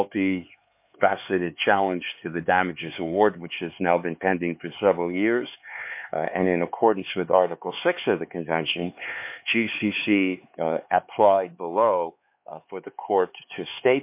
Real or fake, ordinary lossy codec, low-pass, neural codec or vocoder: fake; MP3, 32 kbps; 3.6 kHz; codec, 16 kHz, 4 kbps, X-Codec, HuBERT features, trained on LibriSpeech